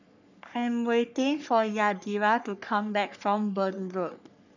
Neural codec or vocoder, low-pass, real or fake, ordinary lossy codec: codec, 44.1 kHz, 3.4 kbps, Pupu-Codec; 7.2 kHz; fake; none